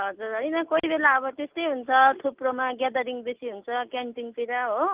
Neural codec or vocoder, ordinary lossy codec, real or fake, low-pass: none; Opus, 32 kbps; real; 3.6 kHz